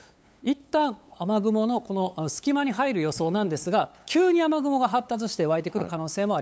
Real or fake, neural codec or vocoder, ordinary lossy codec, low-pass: fake; codec, 16 kHz, 8 kbps, FunCodec, trained on LibriTTS, 25 frames a second; none; none